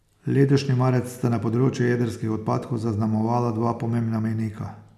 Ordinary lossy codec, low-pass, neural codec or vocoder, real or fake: none; 14.4 kHz; none; real